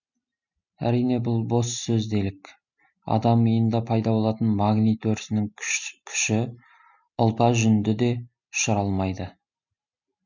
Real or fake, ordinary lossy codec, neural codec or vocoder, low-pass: real; none; none; 7.2 kHz